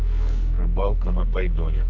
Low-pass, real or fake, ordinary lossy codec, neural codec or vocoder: 7.2 kHz; fake; none; codec, 44.1 kHz, 2.6 kbps, SNAC